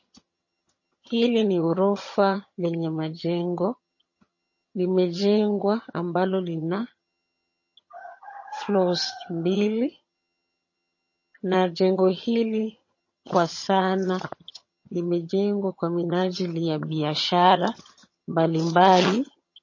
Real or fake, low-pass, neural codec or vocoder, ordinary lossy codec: fake; 7.2 kHz; vocoder, 22.05 kHz, 80 mel bands, HiFi-GAN; MP3, 32 kbps